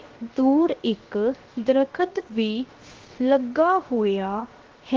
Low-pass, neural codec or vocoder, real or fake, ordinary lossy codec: 7.2 kHz; codec, 16 kHz, 0.7 kbps, FocalCodec; fake; Opus, 16 kbps